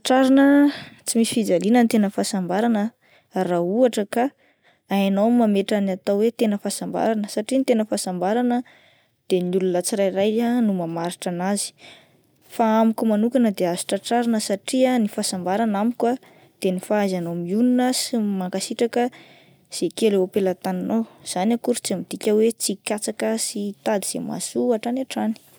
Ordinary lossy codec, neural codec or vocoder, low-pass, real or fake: none; none; none; real